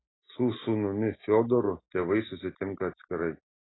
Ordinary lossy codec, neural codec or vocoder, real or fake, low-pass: AAC, 16 kbps; none; real; 7.2 kHz